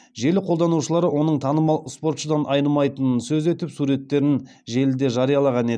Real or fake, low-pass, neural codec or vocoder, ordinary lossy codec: real; none; none; none